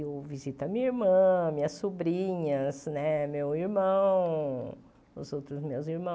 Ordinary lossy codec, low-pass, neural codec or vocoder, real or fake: none; none; none; real